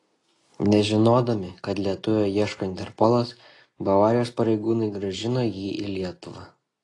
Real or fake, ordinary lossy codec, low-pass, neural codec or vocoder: real; AAC, 32 kbps; 10.8 kHz; none